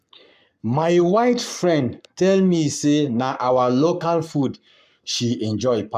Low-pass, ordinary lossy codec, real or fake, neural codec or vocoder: 14.4 kHz; MP3, 96 kbps; fake; codec, 44.1 kHz, 7.8 kbps, Pupu-Codec